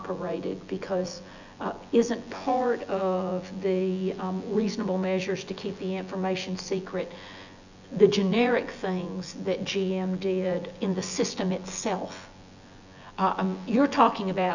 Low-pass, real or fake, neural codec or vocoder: 7.2 kHz; fake; vocoder, 24 kHz, 100 mel bands, Vocos